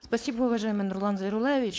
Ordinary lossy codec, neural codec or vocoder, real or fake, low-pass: none; codec, 16 kHz, 4.8 kbps, FACodec; fake; none